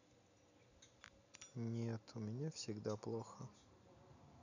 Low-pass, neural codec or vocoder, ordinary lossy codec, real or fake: 7.2 kHz; none; none; real